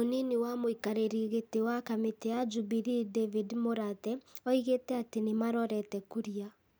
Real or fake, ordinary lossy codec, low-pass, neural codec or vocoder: real; none; none; none